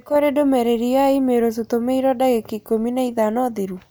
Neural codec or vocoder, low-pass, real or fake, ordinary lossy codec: none; none; real; none